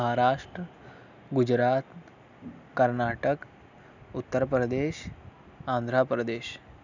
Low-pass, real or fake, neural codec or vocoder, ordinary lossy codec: 7.2 kHz; real; none; none